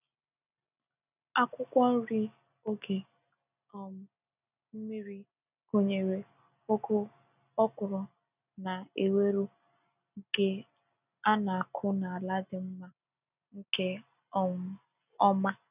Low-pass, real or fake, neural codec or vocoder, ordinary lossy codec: 3.6 kHz; real; none; none